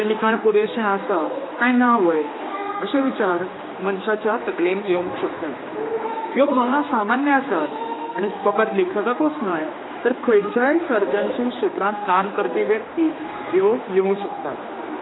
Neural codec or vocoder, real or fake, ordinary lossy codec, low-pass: codec, 16 kHz, 2 kbps, X-Codec, HuBERT features, trained on general audio; fake; AAC, 16 kbps; 7.2 kHz